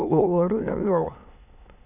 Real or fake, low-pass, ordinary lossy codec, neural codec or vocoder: fake; 3.6 kHz; none; autoencoder, 22.05 kHz, a latent of 192 numbers a frame, VITS, trained on many speakers